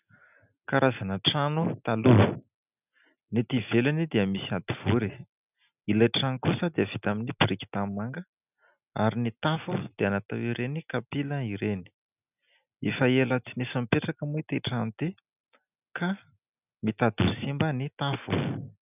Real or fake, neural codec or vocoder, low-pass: real; none; 3.6 kHz